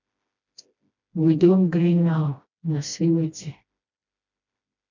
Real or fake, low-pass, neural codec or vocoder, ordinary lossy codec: fake; 7.2 kHz; codec, 16 kHz, 1 kbps, FreqCodec, smaller model; MP3, 64 kbps